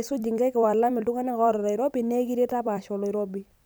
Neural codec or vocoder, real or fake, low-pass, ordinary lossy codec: vocoder, 44.1 kHz, 128 mel bands every 256 samples, BigVGAN v2; fake; none; none